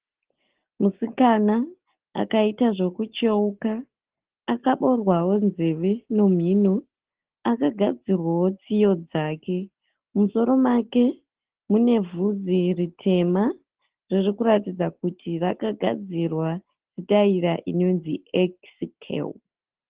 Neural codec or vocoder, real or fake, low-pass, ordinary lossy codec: none; real; 3.6 kHz; Opus, 16 kbps